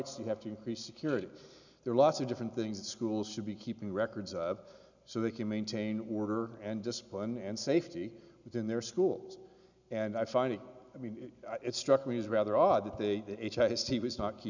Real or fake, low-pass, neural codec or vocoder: real; 7.2 kHz; none